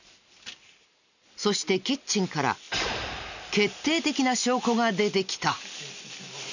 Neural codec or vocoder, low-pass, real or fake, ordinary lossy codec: none; 7.2 kHz; real; none